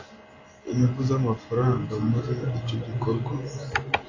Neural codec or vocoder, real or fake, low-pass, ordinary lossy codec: none; real; 7.2 kHz; AAC, 32 kbps